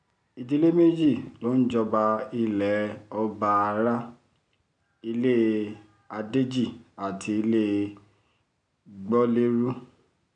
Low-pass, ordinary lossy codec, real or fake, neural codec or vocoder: 9.9 kHz; none; real; none